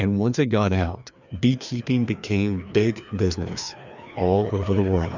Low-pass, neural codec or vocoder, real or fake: 7.2 kHz; codec, 16 kHz, 2 kbps, FreqCodec, larger model; fake